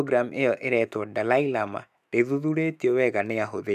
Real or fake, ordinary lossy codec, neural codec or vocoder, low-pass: fake; none; autoencoder, 48 kHz, 128 numbers a frame, DAC-VAE, trained on Japanese speech; 14.4 kHz